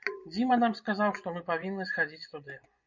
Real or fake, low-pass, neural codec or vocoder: fake; 7.2 kHz; vocoder, 24 kHz, 100 mel bands, Vocos